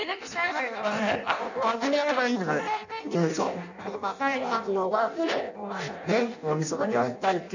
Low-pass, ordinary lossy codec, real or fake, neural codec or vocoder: 7.2 kHz; none; fake; codec, 16 kHz in and 24 kHz out, 0.6 kbps, FireRedTTS-2 codec